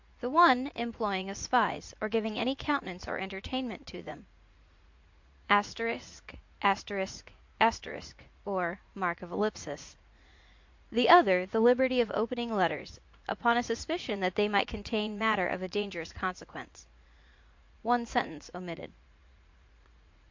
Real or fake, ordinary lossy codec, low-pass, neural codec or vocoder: fake; MP3, 48 kbps; 7.2 kHz; vocoder, 44.1 kHz, 80 mel bands, Vocos